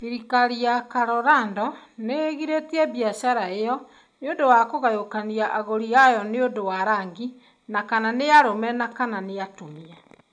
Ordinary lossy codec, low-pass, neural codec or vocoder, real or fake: none; 9.9 kHz; none; real